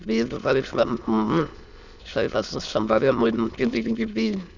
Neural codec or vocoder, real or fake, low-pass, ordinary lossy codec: autoencoder, 22.05 kHz, a latent of 192 numbers a frame, VITS, trained on many speakers; fake; 7.2 kHz; none